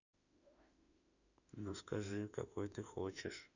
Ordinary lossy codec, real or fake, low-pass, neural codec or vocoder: none; fake; 7.2 kHz; autoencoder, 48 kHz, 32 numbers a frame, DAC-VAE, trained on Japanese speech